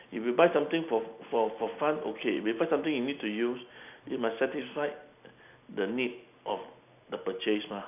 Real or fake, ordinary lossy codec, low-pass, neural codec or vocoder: real; none; 3.6 kHz; none